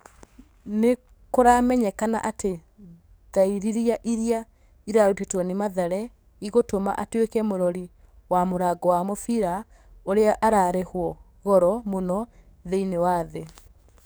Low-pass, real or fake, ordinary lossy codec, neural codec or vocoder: none; fake; none; codec, 44.1 kHz, 7.8 kbps, DAC